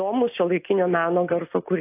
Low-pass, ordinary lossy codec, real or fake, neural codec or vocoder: 3.6 kHz; AAC, 24 kbps; real; none